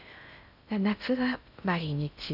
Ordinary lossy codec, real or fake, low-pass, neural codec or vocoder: none; fake; 5.4 kHz; codec, 16 kHz in and 24 kHz out, 0.6 kbps, FocalCodec, streaming, 2048 codes